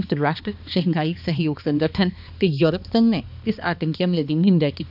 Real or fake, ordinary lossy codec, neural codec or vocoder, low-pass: fake; none; codec, 16 kHz, 2 kbps, X-Codec, HuBERT features, trained on balanced general audio; 5.4 kHz